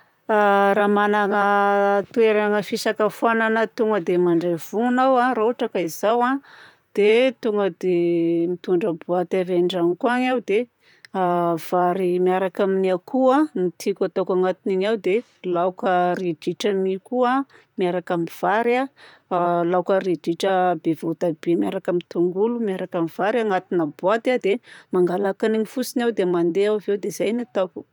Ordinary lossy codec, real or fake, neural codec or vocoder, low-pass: none; fake; vocoder, 44.1 kHz, 128 mel bands, Pupu-Vocoder; 19.8 kHz